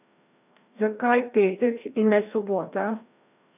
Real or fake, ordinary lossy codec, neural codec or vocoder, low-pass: fake; none; codec, 16 kHz, 1 kbps, FreqCodec, larger model; 3.6 kHz